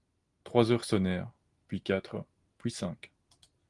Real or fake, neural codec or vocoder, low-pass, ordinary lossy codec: real; none; 10.8 kHz; Opus, 24 kbps